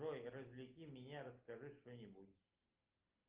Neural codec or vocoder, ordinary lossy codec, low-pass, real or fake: none; Opus, 24 kbps; 3.6 kHz; real